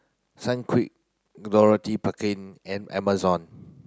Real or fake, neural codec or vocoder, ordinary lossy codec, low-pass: real; none; none; none